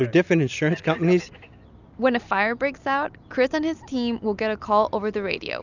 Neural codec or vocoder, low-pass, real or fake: none; 7.2 kHz; real